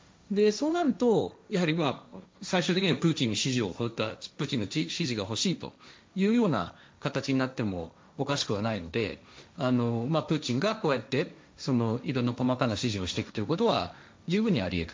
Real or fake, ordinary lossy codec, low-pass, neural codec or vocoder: fake; none; none; codec, 16 kHz, 1.1 kbps, Voila-Tokenizer